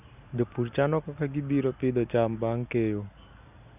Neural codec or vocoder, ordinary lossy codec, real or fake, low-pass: none; none; real; 3.6 kHz